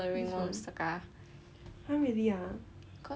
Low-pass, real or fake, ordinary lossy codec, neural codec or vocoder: none; real; none; none